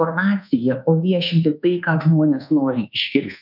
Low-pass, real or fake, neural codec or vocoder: 5.4 kHz; fake; codec, 24 kHz, 1.2 kbps, DualCodec